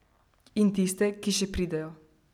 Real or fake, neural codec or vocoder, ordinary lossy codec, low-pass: real; none; none; 19.8 kHz